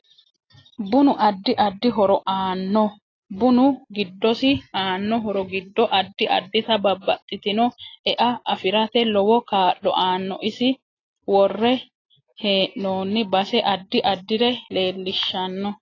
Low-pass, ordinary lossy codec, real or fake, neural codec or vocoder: 7.2 kHz; AAC, 32 kbps; real; none